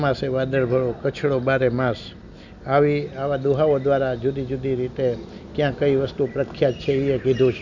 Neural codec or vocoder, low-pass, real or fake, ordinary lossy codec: none; 7.2 kHz; real; none